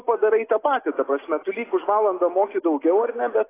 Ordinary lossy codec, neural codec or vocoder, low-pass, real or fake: AAC, 16 kbps; none; 3.6 kHz; real